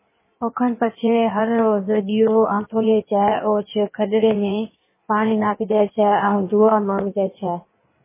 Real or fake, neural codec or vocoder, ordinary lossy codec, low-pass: fake; codec, 16 kHz in and 24 kHz out, 1.1 kbps, FireRedTTS-2 codec; MP3, 16 kbps; 3.6 kHz